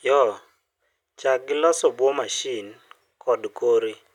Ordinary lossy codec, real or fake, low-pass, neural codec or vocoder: none; real; 19.8 kHz; none